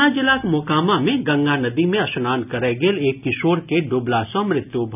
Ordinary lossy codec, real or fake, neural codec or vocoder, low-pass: none; real; none; 3.6 kHz